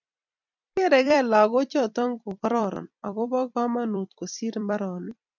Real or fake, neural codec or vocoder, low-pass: fake; vocoder, 44.1 kHz, 128 mel bands every 256 samples, BigVGAN v2; 7.2 kHz